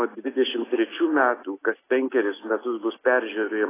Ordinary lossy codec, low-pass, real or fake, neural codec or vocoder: AAC, 16 kbps; 3.6 kHz; real; none